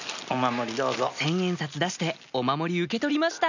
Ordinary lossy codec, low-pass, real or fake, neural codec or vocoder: none; 7.2 kHz; real; none